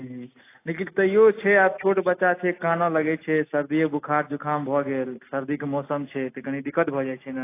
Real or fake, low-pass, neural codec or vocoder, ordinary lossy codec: real; 3.6 kHz; none; AAC, 24 kbps